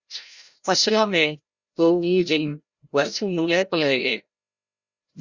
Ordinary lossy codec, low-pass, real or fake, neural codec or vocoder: Opus, 64 kbps; 7.2 kHz; fake; codec, 16 kHz, 0.5 kbps, FreqCodec, larger model